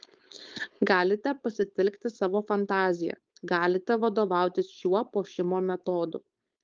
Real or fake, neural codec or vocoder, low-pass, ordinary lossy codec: fake; codec, 16 kHz, 4.8 kbps, FACodec; 7.2 kHz; Opus, 24 kbps